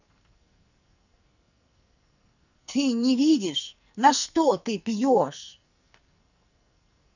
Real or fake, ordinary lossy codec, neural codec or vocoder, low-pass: fake; none; codec, 44.1 kHz, 2.6 kbps, SNAC; 7.2 kHz